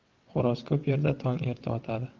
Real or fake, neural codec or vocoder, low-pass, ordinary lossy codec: fake; vocoder, 22.05 kHz, 80 mel bands, WaveNeXt; 7.2 kHz; Opus, 32 kbps